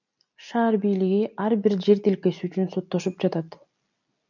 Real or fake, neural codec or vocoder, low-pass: real; none; 7.2 kHz